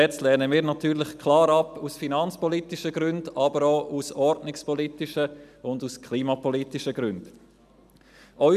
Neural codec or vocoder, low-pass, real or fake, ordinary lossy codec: none; 14.4 kHz; real; none